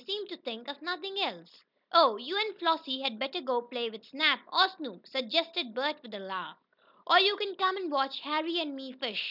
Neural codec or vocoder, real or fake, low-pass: none; real; 5.4 kHz